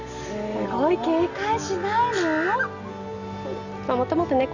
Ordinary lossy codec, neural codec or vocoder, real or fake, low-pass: none; none; real; 7.2 kHz